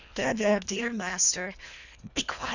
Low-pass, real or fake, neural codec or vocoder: 7.2 kHz; fake; codec, 24 kHz, 1.5 kbps, HILCodec